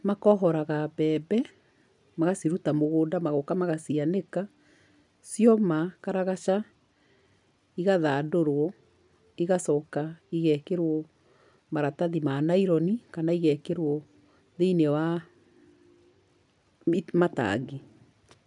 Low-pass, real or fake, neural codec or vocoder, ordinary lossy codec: 10.8 kHz; real; none; none